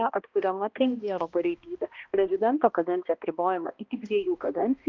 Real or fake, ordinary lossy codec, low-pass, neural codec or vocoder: fake; Opus, 32 kbps; 7.2 kHz; codec, 16 kHz, 1 kbps, X-Codec, HuBERT features, trained on balanced general audio